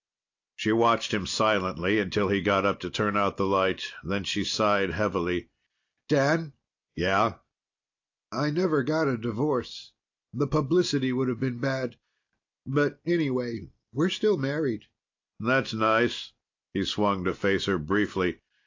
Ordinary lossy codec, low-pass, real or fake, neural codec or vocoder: AAC, 48 kbps; 7.2 kHz; real; none